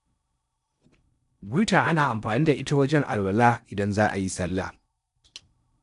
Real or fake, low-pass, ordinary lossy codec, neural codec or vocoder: fake; 10.8 kHz; AAC, 96 kbps; codec, 16 kHz in and 24 kHz out, 0.8 kbps, FocalCodec, streaming, 65536 codes